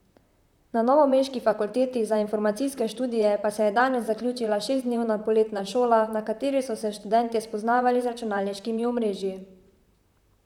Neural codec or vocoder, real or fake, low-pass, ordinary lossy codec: vocoder, 44.1 kHz, 128 mel bands, Pupu-Vocoder; fake; 19.8 kHz; none